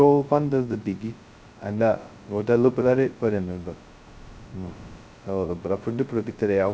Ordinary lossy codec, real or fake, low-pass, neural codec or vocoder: none; fake; none; codec, 16 kHz, 0.2 kbps, FocalCodec